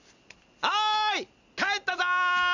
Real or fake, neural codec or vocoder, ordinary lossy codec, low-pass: real; none; none; 7.2 kHz